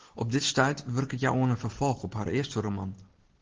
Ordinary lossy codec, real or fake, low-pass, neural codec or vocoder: Opus, 16 kbps; real; 7.2 kHz; none